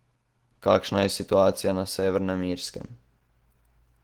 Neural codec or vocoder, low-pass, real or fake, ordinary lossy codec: autoencoder, 48 kHz, 128 numbers a frame, DAC-VAE, trained on Japanese speech; 19.8 kHz; fake; Opus, 16 kbps